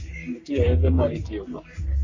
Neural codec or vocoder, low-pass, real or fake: codec, 44.1 kHz, 3.4 kbps, Pupu-Codec; 7.2 kHz; fake